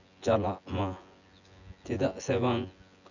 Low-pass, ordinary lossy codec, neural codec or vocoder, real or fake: 7.2 kHz; none; vocoder, 24 kHz, 100 mel bands, Vocos; fake